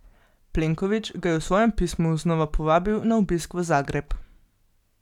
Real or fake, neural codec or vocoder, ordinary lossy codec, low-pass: real; none; none; 19.8 kHz